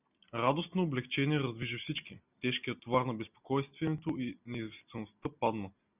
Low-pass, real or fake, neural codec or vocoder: 3.6 kHz; real; none